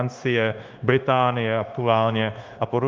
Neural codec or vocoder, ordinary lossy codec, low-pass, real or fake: codec, 16 kHz, 0.9 kbps, LongCat-Audio-Codec; Opus, 24 kbps; 7.2 kHz; fake